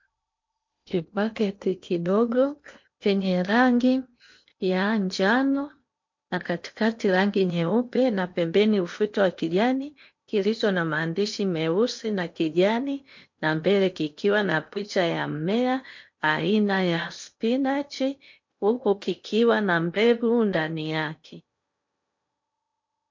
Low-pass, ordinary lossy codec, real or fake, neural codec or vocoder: 7.2 kHz; MP3, 48 kbps; fake; codec, 16 kHz in and 24 kHz out, 0.8 kbps, FocalCodec, streaming, 65536 codes